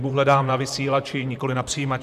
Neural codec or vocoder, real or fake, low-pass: vocoder, 44.1 kHz, 128 mel bands, Pupu-Vocoder; fake; 14.4 kHz